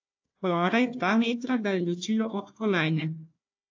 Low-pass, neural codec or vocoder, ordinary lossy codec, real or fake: 7.2 kHz; codec, 16 kHz, 1 kbps, FunCodec, trained on Chinese and English, 50 frames a second; AAC, 48 kbps; fake